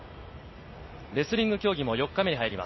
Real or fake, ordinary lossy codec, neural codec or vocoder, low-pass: real; MP3, 24 kbps; none; 7.2 kHz